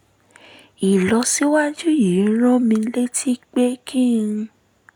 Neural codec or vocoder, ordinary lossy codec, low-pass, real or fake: none; none; 19.8 kHz; real